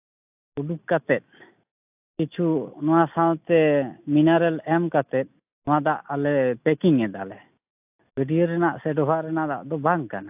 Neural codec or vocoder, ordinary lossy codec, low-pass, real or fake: none; AAC, 32 kbps; 3.6 kHz; real